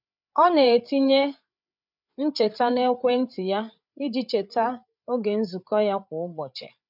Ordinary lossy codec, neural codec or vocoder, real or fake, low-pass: none; codec, 16 kHz, 8 kbps, FreqCodec, larger model; fake; 5.4 kHz